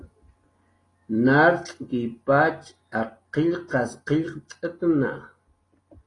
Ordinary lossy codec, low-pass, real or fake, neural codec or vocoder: AAC, 32 kbps; 10.8 kHz; real; none